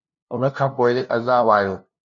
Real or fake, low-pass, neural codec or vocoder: fake; 7.2 kHz; codec, 16 kHz, 0.5 kbps, FunCodec, trained on LibriTTS, 25 frames a second